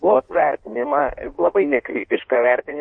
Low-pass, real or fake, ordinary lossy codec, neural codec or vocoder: 9.9 kHz; fake; MP3, 48 kbps; codec, 16 kHz in and 24 kHz out, 1.1 kbps, FireRedTTS-2 codec